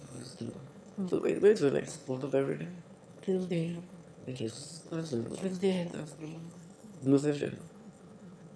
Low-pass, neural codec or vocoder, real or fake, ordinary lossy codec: none; autoencoder, 22.05 kHz, a latent of 192 numbers a frame, VITS, trained on one speaker; fake; none